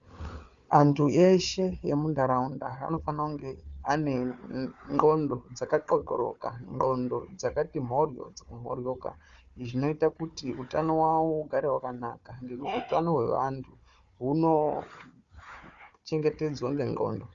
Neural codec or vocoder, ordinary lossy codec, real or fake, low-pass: codec, 16 kHz, 4 kbps, FunCodec, trained on Chinese and English, 50 frames a second; Opus, 64 kbps; fake; 7.2 kHz